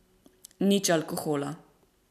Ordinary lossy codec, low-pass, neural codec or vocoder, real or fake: none; 14.4 kHz; none; real